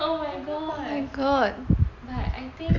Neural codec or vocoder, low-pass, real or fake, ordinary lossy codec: none; 7.2 kHz; real; MP3, 64 kbps